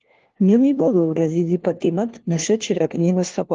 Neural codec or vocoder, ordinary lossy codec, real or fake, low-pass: codec, 16 kHz, 1 kbps, FunCodec, trained on LibriTTS, 50 frames a second; Opus, 16 kbps; fake; 7.2 kHz